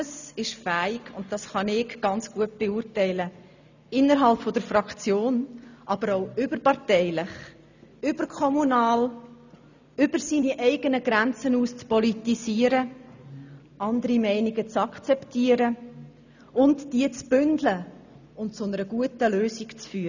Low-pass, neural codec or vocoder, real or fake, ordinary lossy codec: 7.2 kHz; none; real; none